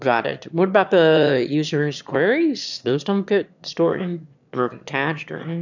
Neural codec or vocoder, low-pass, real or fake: autoencoder, 22.05 kHz, a latent of 192 numbers a frame, VITS, trained on one speaker; 7.2 kHz; fake